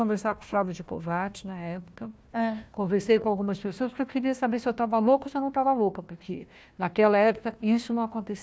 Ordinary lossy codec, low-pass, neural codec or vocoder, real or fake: none; none; codec, 16 kHz, 1 kbps, FunCodec, trained on Chinese and English, 50 frames a second; fake